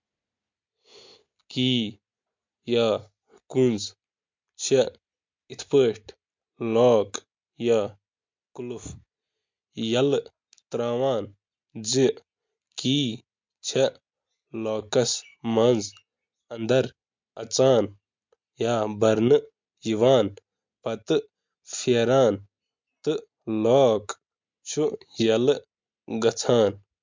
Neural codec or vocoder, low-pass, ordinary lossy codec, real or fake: none; 7.2 kHz; MP3, 64 kbps; real